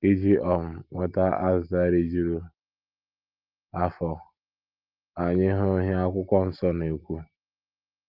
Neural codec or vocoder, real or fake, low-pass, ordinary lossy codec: none; real; 5.4 kHz; Opus, 32 kbps